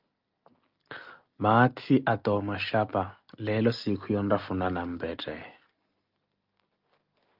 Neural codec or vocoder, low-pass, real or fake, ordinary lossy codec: none; 5.4 kHz; real; Opus, 24 kbps